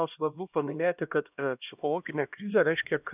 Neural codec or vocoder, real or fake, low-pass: codec, 16 kHz, 1 kbps, X-Codec, HuBERT features, trained on LibriSpeech; fake; 3.6 kHz